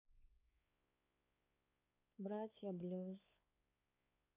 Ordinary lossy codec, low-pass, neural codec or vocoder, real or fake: MP3, 24 kbps; 3.6 kHz; codec, 16 kHz, 4 kbps, X-Codec, WavLM features, trained on Multilingual LibriSpeech; fake